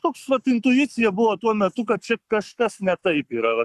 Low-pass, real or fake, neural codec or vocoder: 14.4 kHz; fake; codec, 44.1 kHz, 7.8 kbps, Pupu-Codec